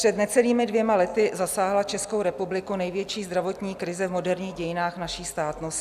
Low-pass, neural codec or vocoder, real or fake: 14.4 kHz; none; real